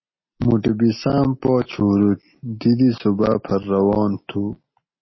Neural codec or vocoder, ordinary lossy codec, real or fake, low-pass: none; MP3, 24 kbps; real; 7.2 kHz